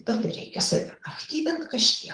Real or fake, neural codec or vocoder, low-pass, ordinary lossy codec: fake; codec, 24 kHz, 6 kbps, HILCodec; 9.9 kHz; Opus, 24 kbps